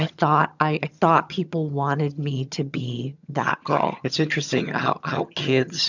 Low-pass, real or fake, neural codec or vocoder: 7.2 kHz; fake; vocoder, 22.05 kHz, 80 mel bands, HiFi-GAN